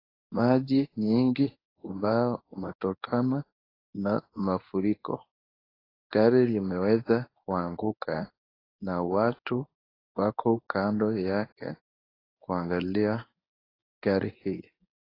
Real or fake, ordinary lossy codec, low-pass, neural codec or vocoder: fake; AAC, 24 kbps; 5.4 kHz; codec, 24 kHz, 0.9 kbps, WavTokenizer, medium speech release version 1